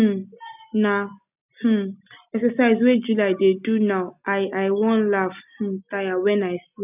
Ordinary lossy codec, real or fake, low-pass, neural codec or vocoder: none; real; 3.6 kHz; none